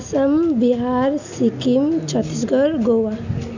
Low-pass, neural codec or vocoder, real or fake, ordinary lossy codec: 7.2 kHz; none; real; none